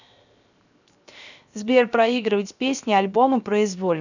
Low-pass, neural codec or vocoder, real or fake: 7.2 kHz; codec, 16 kHz, 0.7 kbps, FocalCodec; fake